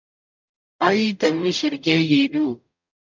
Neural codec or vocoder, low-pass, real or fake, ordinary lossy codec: codec, 44.1 kHz, 0.9 kbps, DAC; 7.2 kHz; fake; MP3, 64 kbps